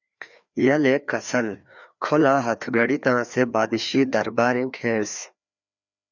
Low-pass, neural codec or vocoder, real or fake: 7.2 kHz; codec, 16 kHz, 2 kbps, FreqCodec, larger model; fake